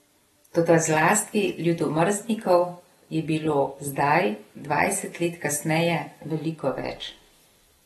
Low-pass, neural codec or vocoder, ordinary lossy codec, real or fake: 19.8 kHz; none; AAC, 32 kbps; real